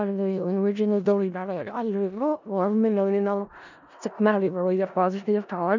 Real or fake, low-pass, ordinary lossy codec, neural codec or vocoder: fake; 7.2 kHz; none; codec, 16 kHz in and 24 kHz out, 0.4 kbps, LongCat-Audio-Codec, four codebook decoder